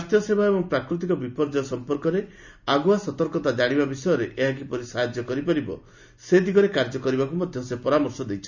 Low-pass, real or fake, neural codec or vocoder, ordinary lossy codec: 7.2 kHz; real; none; none